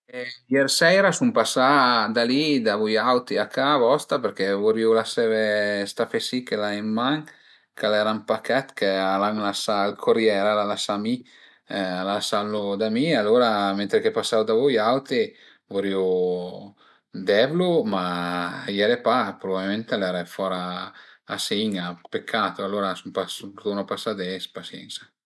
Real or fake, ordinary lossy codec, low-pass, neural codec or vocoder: real; none; none; none